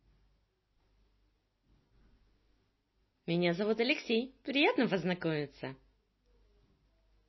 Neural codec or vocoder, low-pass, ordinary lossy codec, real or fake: none; 7.2 kHz; MP3, 24 kbps; real